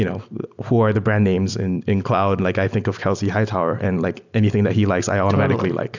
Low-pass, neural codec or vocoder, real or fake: 7.2 kHz; none; real